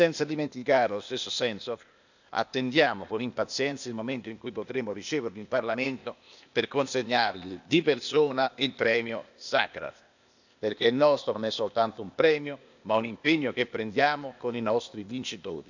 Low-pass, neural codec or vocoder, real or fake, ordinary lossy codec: 7.2 kHz; codec, 16 kHz, 0.8 kbps, ZipCodec; fake; none